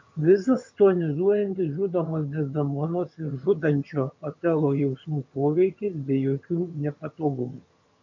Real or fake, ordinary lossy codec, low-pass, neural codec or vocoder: fake; MP3, 48 kbps; 7.2 kHz; vocoder, 22.05 kHz, 80 mel bands, HiFi-GAN